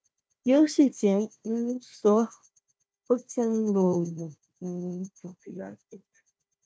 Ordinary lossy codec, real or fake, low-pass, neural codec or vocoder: none; fake; none; codec, 16 kHz, 1 kbps, FunCodec, trained on Chinese and English, 50 frames a second